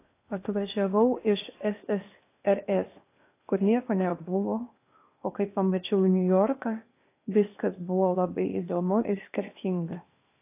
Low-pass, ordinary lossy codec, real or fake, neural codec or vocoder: 3.6 kHz; AAC, 24 kbps; fake; codec, 16 kHz in and 24 kHz out, 0.8 kbps, FocalCodec, streaming, 65536 codes